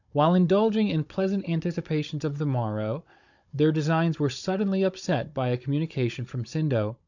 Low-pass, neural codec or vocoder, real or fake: 7.2 kHz; codec, 16 kHz, 16 kbps, FunCodec, trained on Chinese and English, 50 frames a second; fake